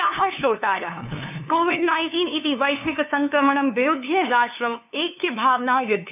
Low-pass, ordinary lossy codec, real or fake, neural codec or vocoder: 3.6 kHz; none; fake; codec, 16 kHz, 2 kbps, FunCodec, trained on LibriTTS, 25 frames a second